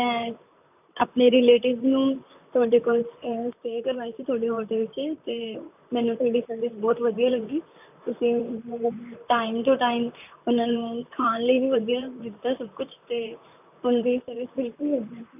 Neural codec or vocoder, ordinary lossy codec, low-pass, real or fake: vocoder, 44.1 kHz, 128 mel bands, Pupu-Vocoder; none; 3.6 kHz; fake